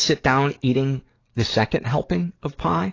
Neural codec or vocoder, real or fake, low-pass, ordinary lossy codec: codec, 44.1 kHz, 7.8 kbps, DAC; fake; 7.2 kHz; AAC, 32 kbps